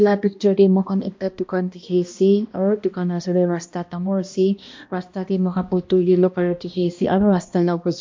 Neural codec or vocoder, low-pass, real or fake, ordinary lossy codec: codec, 16 kHz, 1 kbps, X-Codec, HuBERT features, trained on balanced general audio; 7.2 kHz; fake; MP3, 48 kbps